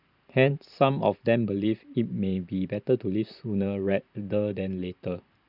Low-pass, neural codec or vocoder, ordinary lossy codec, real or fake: 5.4 kHz; vocoder, 44.1 kHz, 128 mel bands every 512 samples, BigVGAN v2; none; fake